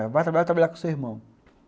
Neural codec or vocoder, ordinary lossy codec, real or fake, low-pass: none; none; real; none